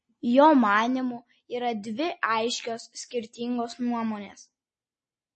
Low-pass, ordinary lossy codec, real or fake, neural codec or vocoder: 10.8 kHz; MP3, 32 kbps; real; none